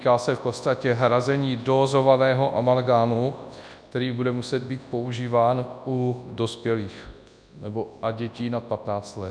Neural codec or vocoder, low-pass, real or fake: codec, 24 kHz, 0.9 kbps, WavTokenizer, large speech release; 9.9 kHz; fake